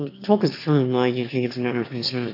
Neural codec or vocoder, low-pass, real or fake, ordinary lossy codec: autoencoder, 22.05 kHz, a latent of 192 numbers a frame, VITS, trained on one speaker; 5.4 kHz; fake; AAC, 32 kbps